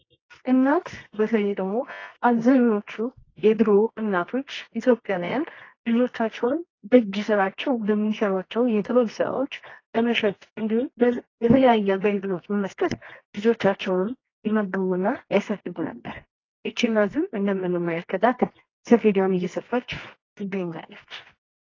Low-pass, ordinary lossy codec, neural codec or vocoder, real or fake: 7.2 kHz; AAC, 32 kbps; codec, 24 kHz, 0.9 kbps, WavTokenizer, medium music audio release; fake